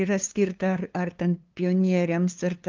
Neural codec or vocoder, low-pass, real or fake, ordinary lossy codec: codec, 16 kHz, 2 kbps, FunCodec, trained on LibriTTS, 25 frames a second; 7.2 kHz; fake; Opus, 24 kbps